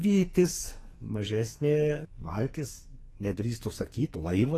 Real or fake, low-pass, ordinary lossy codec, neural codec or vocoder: fake; 14.4 kHz; AAC, 48 kbps; codec, 44.1 kHz, 2.6 kbps, SNAC